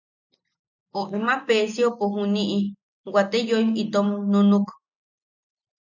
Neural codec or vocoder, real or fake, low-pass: none; real; 7.2 kHz